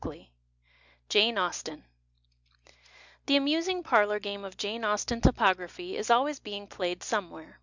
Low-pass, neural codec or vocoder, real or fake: 7.2 kHz; none; real